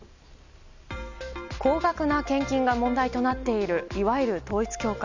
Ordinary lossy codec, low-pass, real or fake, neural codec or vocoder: none; 7.2 kHz; real; none